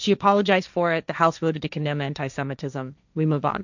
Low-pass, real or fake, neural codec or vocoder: 7.2 kHz; fake; codec, 16 kHz, 1.1 kbps, Voila-Tokenizer